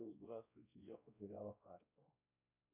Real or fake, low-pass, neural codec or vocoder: fake; 3.6 kHz; codec, 16 kHz, 1 kbps, X-Codec, WavLM features, trained on Multilingual LibriSpeech